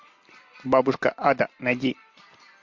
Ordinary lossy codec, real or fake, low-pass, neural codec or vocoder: MP3, 48 kbps; real; 7.2 kHz; none